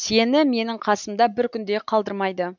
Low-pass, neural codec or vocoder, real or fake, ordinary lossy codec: 7.2 kHz; none; real; none